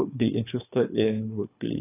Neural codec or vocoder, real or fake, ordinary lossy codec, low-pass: codec, 24 kHz, 3 kbps, HILCodec; fake; AAC, 24 kbps; 3.6 kHz